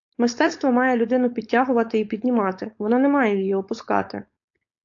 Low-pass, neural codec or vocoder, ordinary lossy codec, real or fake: 7.2 kHz; codec, 16 kHz, 4.8 kbps, FACodec; AAC, 48 kbps; fake